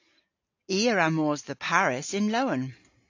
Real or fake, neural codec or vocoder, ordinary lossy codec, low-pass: real; none; AAC, 48 kbps; 7.2 kHz